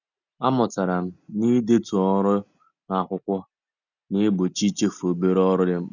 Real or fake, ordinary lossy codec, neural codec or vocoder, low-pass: real; none; none; 7.2 kHz